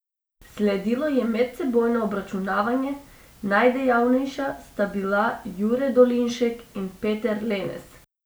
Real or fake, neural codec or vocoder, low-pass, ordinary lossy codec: real; none; none; none